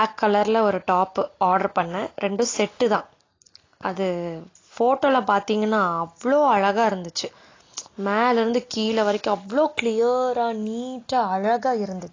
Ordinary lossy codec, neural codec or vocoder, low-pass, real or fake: AAC, 32 kbps; none; 7.2 kHz; real